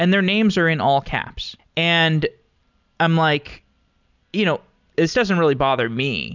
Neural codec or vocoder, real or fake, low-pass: none; real; 7.2 kHz